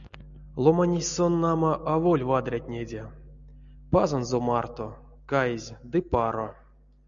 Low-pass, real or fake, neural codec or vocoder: 7.2 kHz; real; none